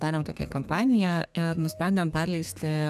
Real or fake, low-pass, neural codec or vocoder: fake; 14.4 kHz; codec, 32 kHz, 1.9 kbps, SNAC